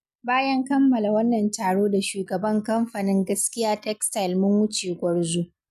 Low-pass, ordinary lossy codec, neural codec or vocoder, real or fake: 14.4 kHz; none; none; real